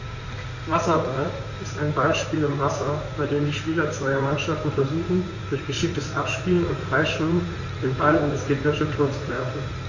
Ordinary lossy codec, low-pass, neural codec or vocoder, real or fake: none; 7.2 kHz; codec, 16 kHz in and 24 kHz out, 2.2 kbps, FireRedTTS-2 codec; fake